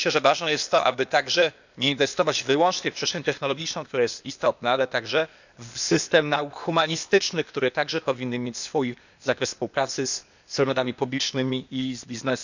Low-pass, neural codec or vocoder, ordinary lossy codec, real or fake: 7.2 kHz; codec, 16 kHz, 0.8 kbps, ZipCodec; none; fake